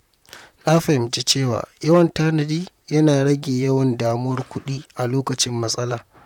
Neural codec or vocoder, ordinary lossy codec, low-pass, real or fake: vocoder, 44.1 kHz, 128 mel bands, Pupu-Vocoder; none; 19.8 kHz; fake